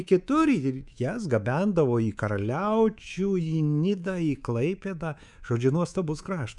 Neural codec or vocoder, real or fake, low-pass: codec, 24 kHz, 3.1 kbps, DualCodec; fake; 10.8 kHz